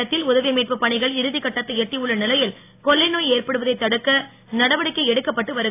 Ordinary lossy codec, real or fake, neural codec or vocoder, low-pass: AAC, 24 kbps; real; none; 3.6 kHz